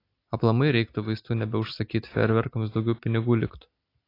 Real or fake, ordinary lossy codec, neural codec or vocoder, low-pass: real; AAC, 32 kbps; none; 5.4 kHz